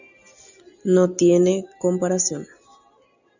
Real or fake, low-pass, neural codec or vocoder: real; 7.2 kHz; none